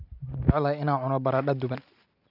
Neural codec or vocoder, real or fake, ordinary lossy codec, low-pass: none; real; none; 5.4 kHz